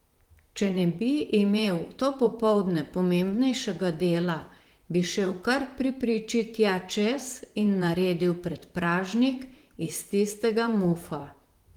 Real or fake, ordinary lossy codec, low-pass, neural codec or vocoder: fake; Opus, 24 kbps; 19.8 kHz; vocoder, 44.1 kHz, 128 mel bands, Pupu-Vocoder